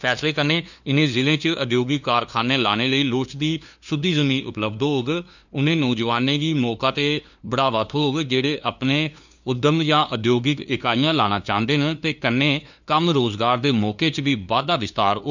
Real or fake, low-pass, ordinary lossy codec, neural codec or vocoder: fake; 7.2 kHz; none; codec, 16 kHz, 2 kbps, FunCodec, trained on LibriTTS, 25 frames a second